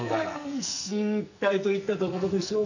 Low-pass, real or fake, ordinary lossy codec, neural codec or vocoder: 7.2 kHz; fake; none; codec, 44.1 kHz, 2.6 kbps, SNAC